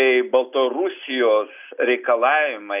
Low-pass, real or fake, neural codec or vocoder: 3.6 kHz; real; none